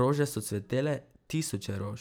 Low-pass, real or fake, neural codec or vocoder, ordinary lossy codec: none; fake; vocoder, 44.1 kHz, 128 mel bands every 512 samples, BigVGAN v2; none